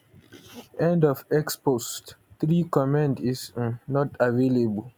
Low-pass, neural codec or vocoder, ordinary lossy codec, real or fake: none; none; none; real